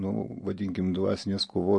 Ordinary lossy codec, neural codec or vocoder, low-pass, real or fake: MP3, 48 kbps; none; 10.8 kHz; real